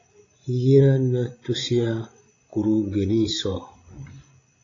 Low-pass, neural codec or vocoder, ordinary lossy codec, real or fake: 7.2 kHz; codec, 16 kHz, 16 kbps, FreqCodec, larger model; AAC, 32 kbps; fake